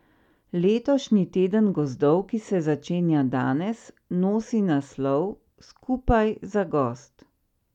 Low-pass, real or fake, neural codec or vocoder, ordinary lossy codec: 19.8 kHz; real; none; none